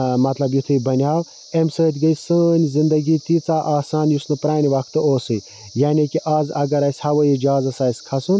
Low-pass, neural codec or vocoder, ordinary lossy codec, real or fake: none; none; none; real